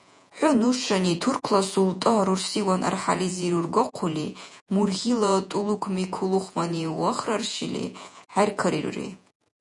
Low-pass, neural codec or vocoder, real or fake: 10.8 kHz; vocoder, 48 kHz, 128 mel bands, Vocos; fake